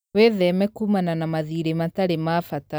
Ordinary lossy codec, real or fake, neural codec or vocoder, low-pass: none; real; none; none